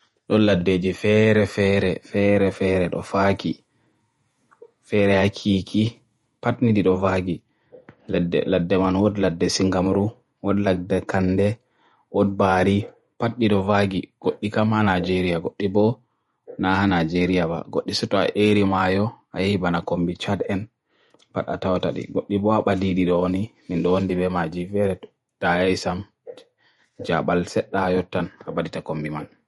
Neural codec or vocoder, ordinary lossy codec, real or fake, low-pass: vocoder, 48 kHz, 128 mel bands, Vocos; MP3, 48 kbps; fake; 19.8 kHz